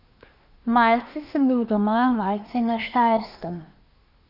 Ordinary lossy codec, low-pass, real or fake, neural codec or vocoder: none; 5.4 kHz; fake; codec, 24 kHz, 1 kbps, SNAC